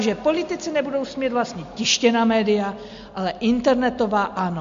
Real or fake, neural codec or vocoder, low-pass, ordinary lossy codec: real; none; 7.2 kHz; MP3, 48 kbps